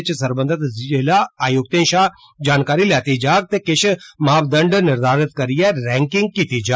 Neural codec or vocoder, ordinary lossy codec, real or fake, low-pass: none; none; real; none